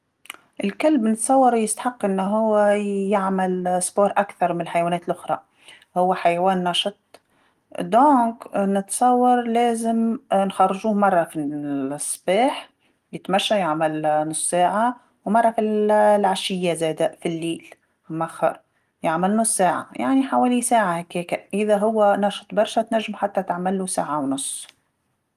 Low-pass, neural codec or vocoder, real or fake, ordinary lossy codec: 14.4 kHz; none; real; Opus, 24 kbps